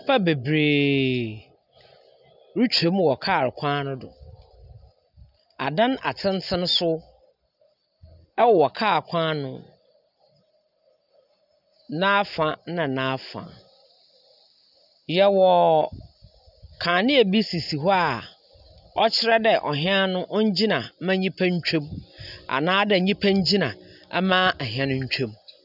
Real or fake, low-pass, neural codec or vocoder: real; 5.4 kHz; none